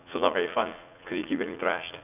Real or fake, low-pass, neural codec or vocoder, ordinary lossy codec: fake; 3.6 kHz; vocoder, 44.1 kHz, 80 mel bands, Vocos; none